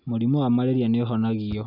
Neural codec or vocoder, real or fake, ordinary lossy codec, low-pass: none; real; none; 5.4 kHz